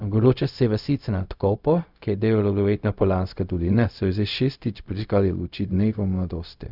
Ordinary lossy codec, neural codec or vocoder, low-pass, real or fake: none; codec, 16 kHz, 0.4 kbps, LongCat-Audio-Codec; 5.4 kHz; fake